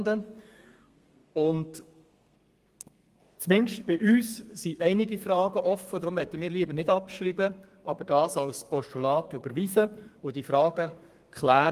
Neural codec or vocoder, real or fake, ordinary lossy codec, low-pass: codec, 32 kHz, 1.9 kbps, SNAC; fake; Opus, 32 kbps; 14.4 kHz